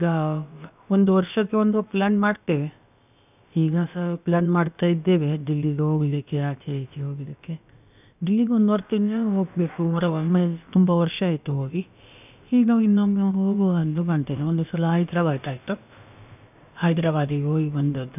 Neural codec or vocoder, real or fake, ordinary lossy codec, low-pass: codec, 16 kHz, about 1 kbps, DyCAST, with the encoder's durations; fake; none; 3.6 kHz